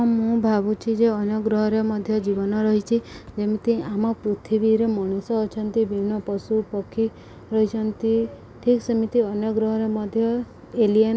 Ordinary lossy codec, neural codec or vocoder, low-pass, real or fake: none; none; none; real